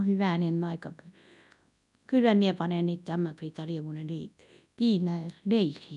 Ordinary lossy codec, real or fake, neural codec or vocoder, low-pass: none; fake; codec, 24 kHz, 0.9 kbps, WavTokenizer, large speech release; 10.8 kHz